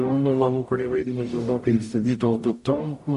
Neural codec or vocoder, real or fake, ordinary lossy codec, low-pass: codec, 44.1 kHz, 0.9 kbps, DAC; fake; MP3, 48 kbps; 14.4 kHz